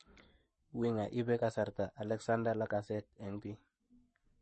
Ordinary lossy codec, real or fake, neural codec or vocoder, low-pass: MP3, 32 kbps; fake; codec, 44.1 kHz, 7.8 kbps, Pupu-Codec; 10.8 kHz